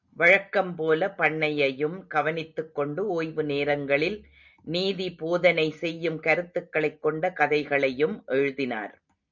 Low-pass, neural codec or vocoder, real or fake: 7.2 kHz; none; real